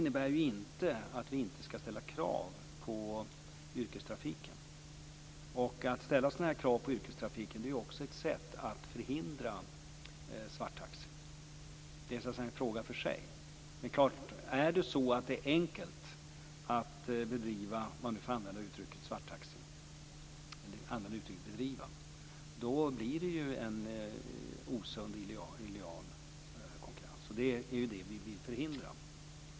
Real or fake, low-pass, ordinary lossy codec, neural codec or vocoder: real; none; none; none